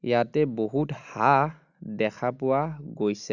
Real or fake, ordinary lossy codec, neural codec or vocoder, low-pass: real; none; none; 7.2 kHz